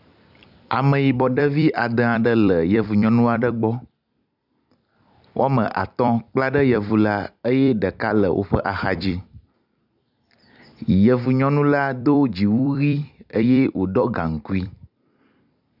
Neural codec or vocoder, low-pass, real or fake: vocoder, 44.1 kHz, 128 mel bands every 256 samples, BigVGAN v2; 5.4 kHz; fake